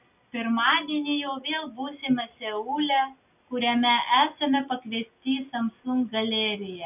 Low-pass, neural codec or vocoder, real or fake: 3.6 kHz; none; real